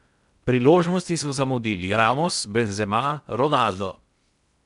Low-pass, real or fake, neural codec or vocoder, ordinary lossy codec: 10.8 kHz; fake; codec, 16 kHz in and 24 kHz out, 0.8 kbps, FocalCodec, streaming, 65536 codes; none